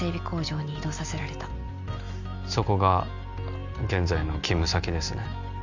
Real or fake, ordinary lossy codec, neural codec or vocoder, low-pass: real; none; none; 7.2 kHz